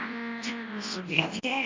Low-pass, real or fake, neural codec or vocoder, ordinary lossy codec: 7.2 kHz; fake; codec, 24 kHz, 0.9 kbps, WavTokenizer, large speech release; MP3, 48 kbps